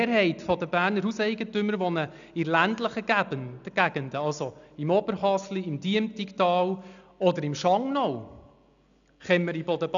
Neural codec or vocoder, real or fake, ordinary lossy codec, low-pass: none; real; none; 7.2 kHz